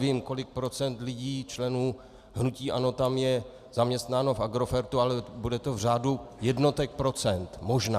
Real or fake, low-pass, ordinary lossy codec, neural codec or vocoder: real; 14.4 kHz; Opus, 64 kbps; none